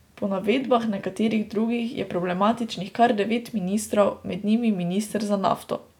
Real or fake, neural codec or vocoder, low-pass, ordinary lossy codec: real; none; 19.8 kHz; none